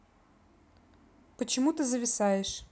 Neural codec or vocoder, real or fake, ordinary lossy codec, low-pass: none; real; none; none